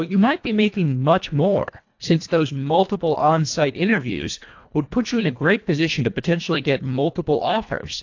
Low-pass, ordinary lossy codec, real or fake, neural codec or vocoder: 7.2 kHz; AAC, 48 kbps; fake; codec, 24 kHz, 1.5 kbps, HILCodec